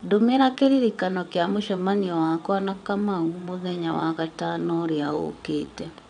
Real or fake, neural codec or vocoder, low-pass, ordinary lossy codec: fake; vocoder, 22.05 kHz, 80 mel bands, WaveNeXt; 9.9 kHz; none